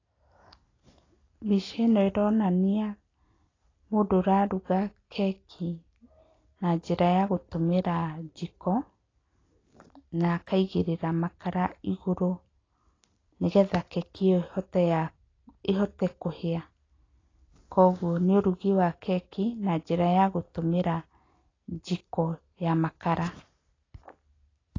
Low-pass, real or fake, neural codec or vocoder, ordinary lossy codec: 7.2 kHz; real; none; AAC, 32 kbps